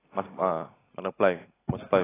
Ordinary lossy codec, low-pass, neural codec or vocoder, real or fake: AAC, 16 kbps; 3.6 kHz; none; real